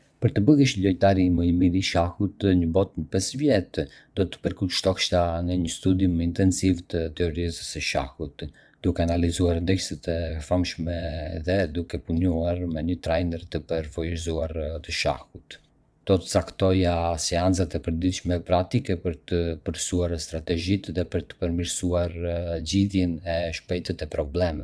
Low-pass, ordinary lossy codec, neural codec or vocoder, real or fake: none; none; vocoder, 22.05 kHz, 80 mel bands, WaveNeXt; fake